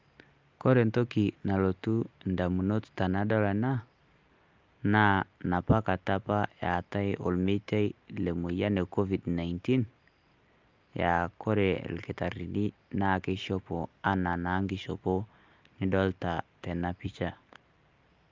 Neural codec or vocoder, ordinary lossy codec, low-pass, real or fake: none; Opus, 24 kbps; 7.2 kHz; real